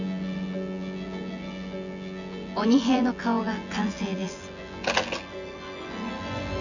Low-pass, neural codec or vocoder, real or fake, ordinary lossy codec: 7.2 kHz; vocoder, 24 kHz, 100 mel bands, Vocos; fake; none